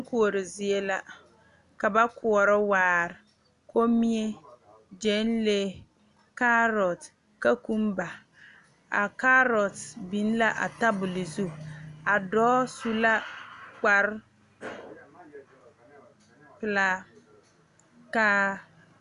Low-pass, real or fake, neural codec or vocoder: 10.8 kHz; real; none